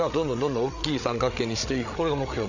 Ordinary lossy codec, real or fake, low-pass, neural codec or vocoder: none; fake; 7.2 kHz; codec, 16 kHz, 8 kbps, FreqCodec, larger model